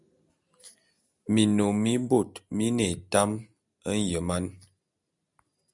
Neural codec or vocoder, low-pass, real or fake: none; 10.8 kHz; real